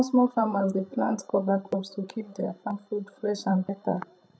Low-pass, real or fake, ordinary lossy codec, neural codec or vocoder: none; fake; none; codec, 16 kHz, 8 kbps, FreqCodec, larger model